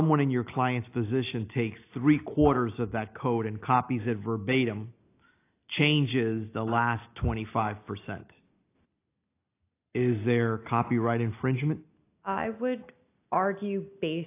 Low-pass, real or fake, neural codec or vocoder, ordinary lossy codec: 3.6 kHz; real; none; AAC, 24 kbps